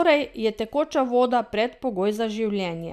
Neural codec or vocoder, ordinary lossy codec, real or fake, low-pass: none; none; real; 14.4 kHz